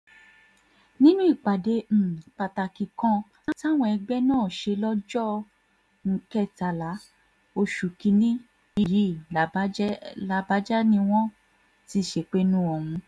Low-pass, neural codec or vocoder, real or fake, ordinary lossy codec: none; none; real; none